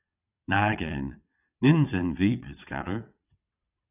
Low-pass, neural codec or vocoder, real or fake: 3.6 kHz; vocoder, 22.05 kHz, 80 mel bands, WaveNeXt; fake